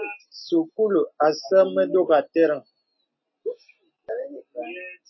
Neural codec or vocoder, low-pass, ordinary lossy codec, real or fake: none; 7.2 kHz; MP3, 24 kbps; real